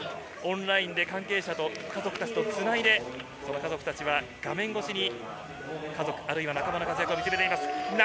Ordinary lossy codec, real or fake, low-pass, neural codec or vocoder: none; real; none; none